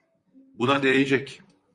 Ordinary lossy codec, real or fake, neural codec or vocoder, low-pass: MP3, 96 kbps; fake; vocoder, 44.1 kHz, 128 mel bands, Pupu-Vocoder; 10.8 kHz